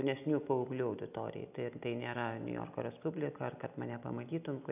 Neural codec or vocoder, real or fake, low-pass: none; real; 3.6 kHz